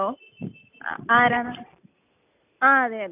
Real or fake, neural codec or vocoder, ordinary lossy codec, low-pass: real; none; none; 3.6 kHz